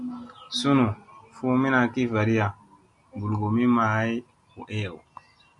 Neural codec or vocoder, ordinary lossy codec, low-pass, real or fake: none; Opus, 64 kbps; 10.8 kHz; real